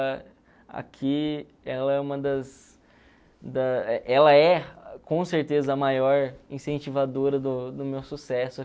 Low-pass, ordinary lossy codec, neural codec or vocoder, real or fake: none; none; none; real